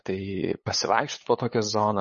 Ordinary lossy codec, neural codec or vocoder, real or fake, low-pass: MP3, 32 kbps; codec, 16 kHz, 8 kbps, FunCodec, trained on LibriTTS, 25 frames a second; fake; 7.2 kHz